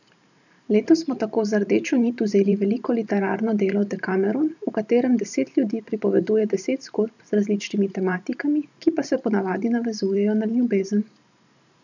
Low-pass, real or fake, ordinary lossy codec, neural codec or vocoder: 7.2 kHz; fake; none; vocoder, 44.1 kHz, 128 mel bands every 512 samples, BigVGAN v2